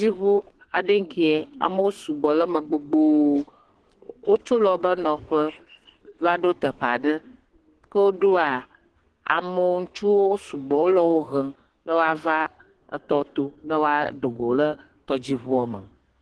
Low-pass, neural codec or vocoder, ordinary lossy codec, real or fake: 10.8 kHz; codec, 32 kHz, 1.9 kbps, SNAC; Opus, 16 kbps; fake